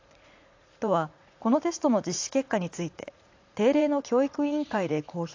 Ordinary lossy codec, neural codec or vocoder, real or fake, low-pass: AAC, 48 kbps; vocoder, 44.1 kHz, 80 mel bands, Vocos; fake; 7.2 kHz